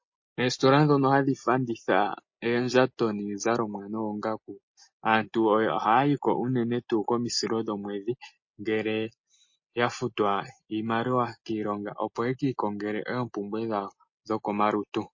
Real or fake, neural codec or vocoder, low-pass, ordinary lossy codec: real; none; 7.2 kHz; MP3, 32 kbps